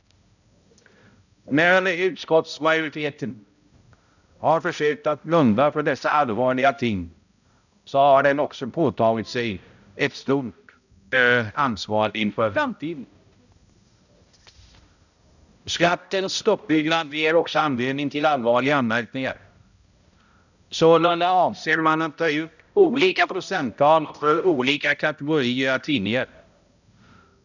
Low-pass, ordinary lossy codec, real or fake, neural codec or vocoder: 7.2 kHz; none; fake; codec, 16 kHz, 0.5 kbps, X-Codec, HuBERT features, trained on balanced general audio